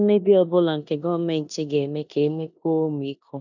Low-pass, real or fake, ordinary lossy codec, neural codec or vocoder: 7.2 kHz; fake; none; codec, 16 kHz in and 24 kHz out, 0.9 kbps, LongCat-Audio-Codec, four codebook decoder